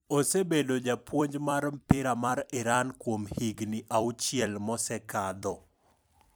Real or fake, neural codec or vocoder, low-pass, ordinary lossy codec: fake; vocoder, 44.1 kHz, 128 mel bands every 256 samples, BigVGAN v2; none; none